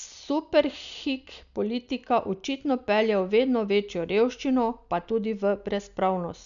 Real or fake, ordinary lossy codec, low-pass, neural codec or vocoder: real; none; 7.2 kHz; none